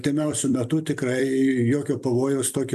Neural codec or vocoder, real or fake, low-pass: vocoder, 44.1 kHz, 128 mel bands every 256 samples, BigVGAN v2; fake; 14.4 kHz